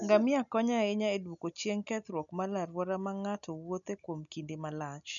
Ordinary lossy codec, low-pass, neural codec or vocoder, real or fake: none; 7.2 kHz; none; real